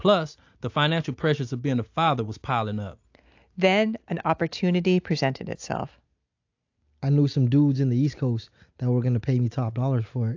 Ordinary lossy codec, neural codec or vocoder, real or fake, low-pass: AAC, 48 kbps; none; real; 7.2 kHz